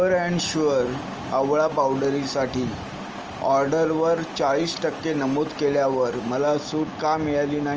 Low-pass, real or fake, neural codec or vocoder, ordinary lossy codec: 7.2 kHz; real; none; Opus, 24 kbps